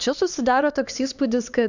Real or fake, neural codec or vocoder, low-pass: fake; codec, 16 kHz, 2 kbps, X-Codec, HuBERT features, trained on LibriSpeech; 7.2 kHz